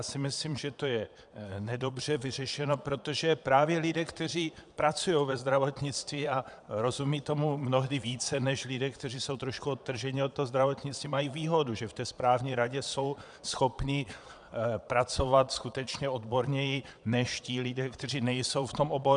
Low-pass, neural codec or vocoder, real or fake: 9.9 kHz; vocoder, 22.05 kHz, 80 mel bands, Vocos; fake